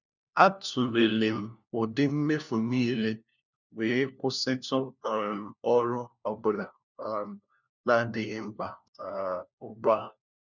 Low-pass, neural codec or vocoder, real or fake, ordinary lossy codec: 7.2 kHz; codec, 16 kHz, 1 kbps, FunCodec, trained on LibriTTS, 50 frames a second; fake; none